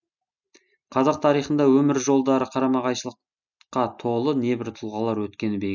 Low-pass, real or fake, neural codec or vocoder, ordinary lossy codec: 7.2 kHz; real; none; none